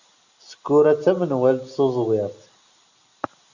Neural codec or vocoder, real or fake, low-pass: none; real; 7.2 kHz